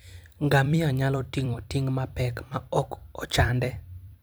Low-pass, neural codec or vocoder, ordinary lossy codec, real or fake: none; vocoder, 44.1 kHz, 128 mel bands every 256 samples, BigVGAN v2; none; fake